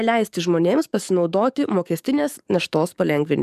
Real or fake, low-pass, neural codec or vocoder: fake; 14.4 kHz; codec, 44.1 kHz, 7.8 kbps, DAC